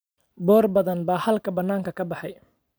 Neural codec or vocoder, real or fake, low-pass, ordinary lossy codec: none; real; none; none